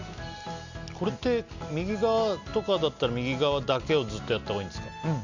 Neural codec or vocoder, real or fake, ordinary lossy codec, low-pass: none; real; none; 7.2 kHz